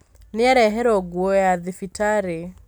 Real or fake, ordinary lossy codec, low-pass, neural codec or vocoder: real; none; none; none